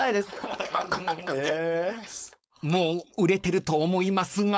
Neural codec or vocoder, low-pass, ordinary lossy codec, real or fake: codec, 16 kHz, 4.8 kbps, FACodec; none; none; fake